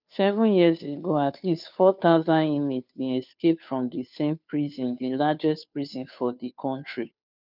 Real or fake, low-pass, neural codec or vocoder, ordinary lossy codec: fake; 5.4 kHz; codec, 16 kHz, 2 kbps, FunCodec, trained on Chinese and English, 25 frames a second; none